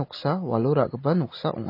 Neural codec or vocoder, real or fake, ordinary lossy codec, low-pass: none; real; MP3, 24 kbps; 5.4 kHz